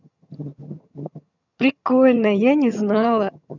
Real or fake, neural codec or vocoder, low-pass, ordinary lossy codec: fake; vocoder, 22.05 kHz, 80 mel bands, HiFi-GAN; 7.2 kHz; none